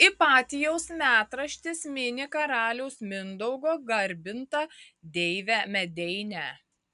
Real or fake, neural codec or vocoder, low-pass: real; none; 10.8 kHz